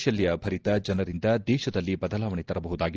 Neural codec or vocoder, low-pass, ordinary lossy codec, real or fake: none; 7.2 kHz; Opus, 16 kbps; real